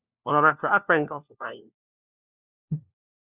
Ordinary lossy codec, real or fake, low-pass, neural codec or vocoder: Opus, 64 kbps; fake; 3.6 kHz; codec, 16 kHz, 1 kbps, FunCodec, trained on LibriTTS, 50 frames a second